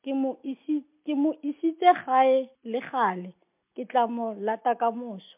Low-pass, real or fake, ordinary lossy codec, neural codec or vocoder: 3.6 kHz; real; MP3, 32 kbps; none